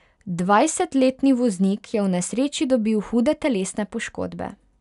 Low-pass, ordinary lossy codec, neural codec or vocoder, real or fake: 10.8 kHz; none; none; real